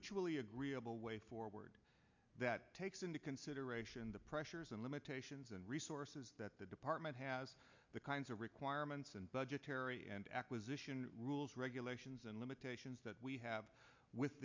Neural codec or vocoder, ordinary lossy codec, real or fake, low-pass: none; MP3, 64 kbps; real; 7.2 kHz